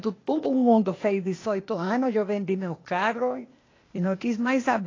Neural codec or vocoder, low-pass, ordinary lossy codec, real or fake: codec, 16 kHz, 0.8 kbps, ZipCodec; 7.2 kHz; AAC, 32 kbps; fake